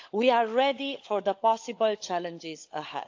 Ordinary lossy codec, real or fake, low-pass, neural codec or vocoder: AAC, 48 kbps; fake; 7.2 kHz; codec, 16 kHz, 16 kbps, FunCodec, trained on LibriTTS, 50 frames a second